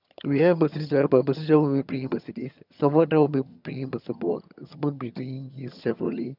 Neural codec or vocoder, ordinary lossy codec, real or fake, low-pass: vocoder, 22.05 kHz, 80 mel bands, HiFi-GAN; none; fake; 5.4 kHz